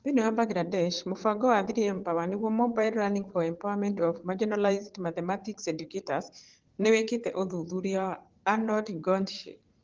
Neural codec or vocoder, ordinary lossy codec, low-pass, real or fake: vocoder, 44.1 kHz, 80 mel bands, Vocos; Opus, 16 kbps; 7.2 kHz; fake